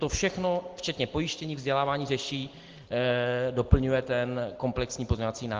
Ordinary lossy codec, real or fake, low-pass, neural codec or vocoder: Opus, 16 kbps; real; 7.2 kHz; none